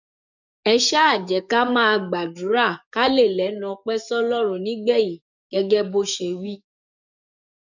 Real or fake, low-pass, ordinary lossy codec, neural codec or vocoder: fake; 7.2 kHz; none; codec, 44.1 kHz, 7.8 kbps, DAC